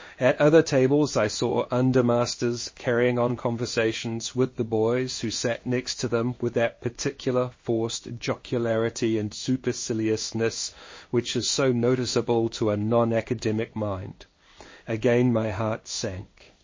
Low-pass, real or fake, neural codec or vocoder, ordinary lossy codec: 7.2 kHz; fake; codec, 16 kHz in and 24 kHz out, 1 kbps, XY-Tokenizer; MP3, 32 kbps